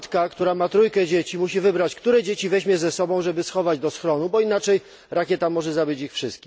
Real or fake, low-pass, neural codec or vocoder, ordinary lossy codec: real; none; none; none